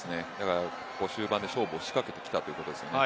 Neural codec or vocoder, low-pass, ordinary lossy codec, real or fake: none; none; none; real